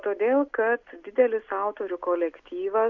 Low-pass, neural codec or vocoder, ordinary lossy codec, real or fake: 7.2 kHz; none; MP3, 64 kbps; real